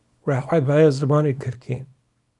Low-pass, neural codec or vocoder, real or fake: 10.8 kHz; codec, 24 kHz, 0.9 kbps, WavTokenizer, small release; fake